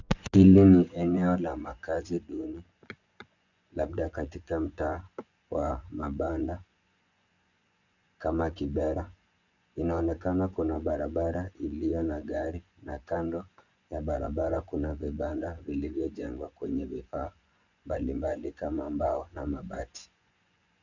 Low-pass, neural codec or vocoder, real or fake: 7.2 kHz; vocoder, 24 kHz, 100 mel bands, Vocos; fake